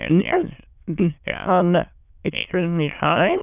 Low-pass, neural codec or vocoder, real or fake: 3.6 kHz; autoencoder, 22.05 kHz, a latent of 192 numbers a frame, VITS, trained on many speakers; fake